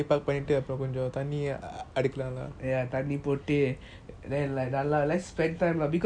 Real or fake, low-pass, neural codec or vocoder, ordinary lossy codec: real; 9.9 kHz; none; MP3, 96 kbps